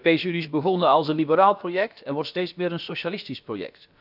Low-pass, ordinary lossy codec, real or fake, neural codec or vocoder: 5.4 kHz; none; fake; codec, 16 kHz, about 1 kbps, DyCAST, with the encoder's durations